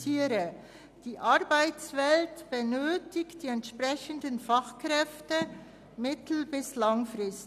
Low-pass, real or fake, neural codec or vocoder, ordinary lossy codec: 14.4 kHz; real; none; none